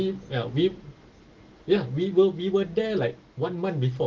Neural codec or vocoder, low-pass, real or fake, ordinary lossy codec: none; 7.2 kHz; real; Opus, 16 kbps